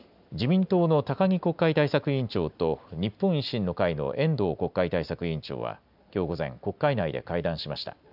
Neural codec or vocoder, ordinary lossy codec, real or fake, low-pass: none; none; real; 5.4 kHz